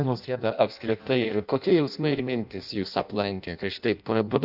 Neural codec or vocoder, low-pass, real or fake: codec, 16 kHz in and 24 kHz out, 0.6 kbps, FireRedTTS-2 codec; 5.4 kHz; fake